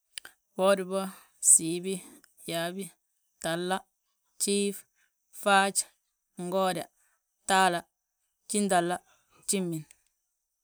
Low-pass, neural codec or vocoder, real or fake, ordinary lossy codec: none; none; real; none